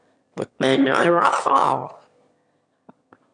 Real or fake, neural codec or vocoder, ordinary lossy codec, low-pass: fake; autoencoder, 22.05 kHz, a latent of 192 numbers a frame, VITS, trained on one speaker; AAC, 64 kbps; 9.9 kHz